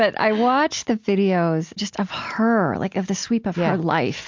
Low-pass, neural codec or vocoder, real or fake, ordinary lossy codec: 7.2 kHz; none; real; MP3, 48 kbps